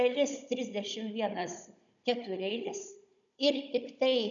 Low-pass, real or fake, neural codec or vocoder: 7.2 kHz; fake; codec, 16 kHz, 16 kbps, FunCodec, trained on Chinese and English, 50 frames a second